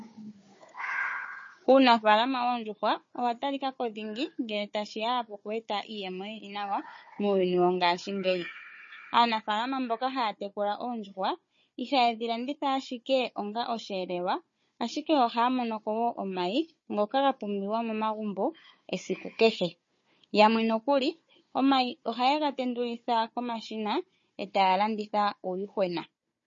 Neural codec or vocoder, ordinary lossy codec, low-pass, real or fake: codec, 16 kHz, 4 kbps, FunCodec, trained on Chinese and English, 50 frames a second; MP3, 32 kbps; 7.2 kHz; fake